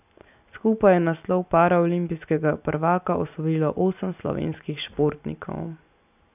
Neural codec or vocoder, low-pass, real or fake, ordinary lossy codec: none; 3.6 kHz; real; none